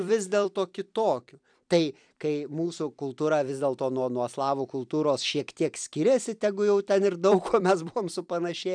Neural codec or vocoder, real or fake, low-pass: vocoder, 44.1 kHz, 128 mel bands every 256 samples, BigVGAN v2; fake; 9.9 kHz